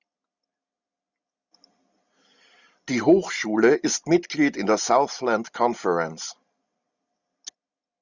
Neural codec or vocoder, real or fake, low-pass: none; real; 7.2 kHz